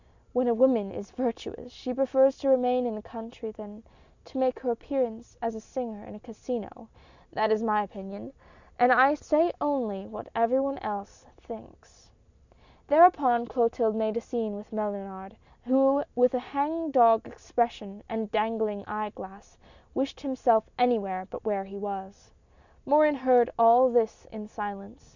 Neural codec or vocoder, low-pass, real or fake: none; 7.2 kHz; real